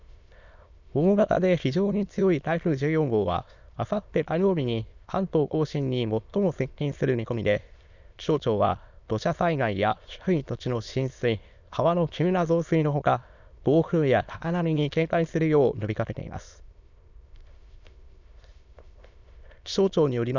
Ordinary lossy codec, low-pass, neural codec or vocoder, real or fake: none; 7.2 kHz; autoencoder, 22.05 kHz, a latent of 192 numbers a frame, VITS, trained on many speakers; fake